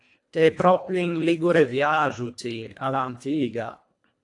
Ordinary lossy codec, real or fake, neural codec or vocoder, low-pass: AAC, 64 kbps; fake; codec, 24 kHz, 1.5 kbps, HILCodec; 10.8 kHz